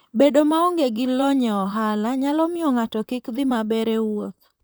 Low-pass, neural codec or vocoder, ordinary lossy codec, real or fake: none; vocoder, 44.1 kHz, 128 mel bands, Pupu-Vocoder; none; fake